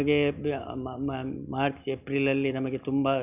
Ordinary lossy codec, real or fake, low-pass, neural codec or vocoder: none; real; 3.6 kHz; none